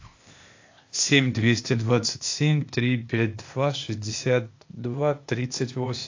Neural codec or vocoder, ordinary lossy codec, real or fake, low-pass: codec, 16 kHz, 0.8 kbps, ZipCodec; AAC, 48 kbps; fake; 7.2 kHz